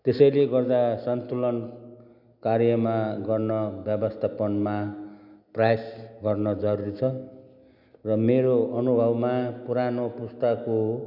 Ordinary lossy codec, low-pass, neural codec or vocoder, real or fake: none; 5.4 kHz; none; real